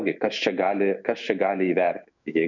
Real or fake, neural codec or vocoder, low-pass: fake; autoencoder, 48 kHz, 128 numbers a frame, DAC-VAE, trained on Japanese speech; 7.2 kHz